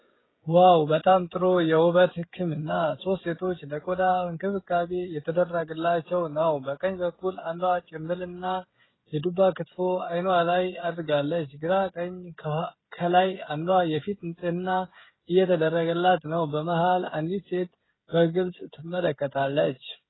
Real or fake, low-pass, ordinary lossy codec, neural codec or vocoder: fake; 7.2 kHz; AAC, 16 kbps; codec, 16 kHz, 16 kbps, FreqCodec, smaller model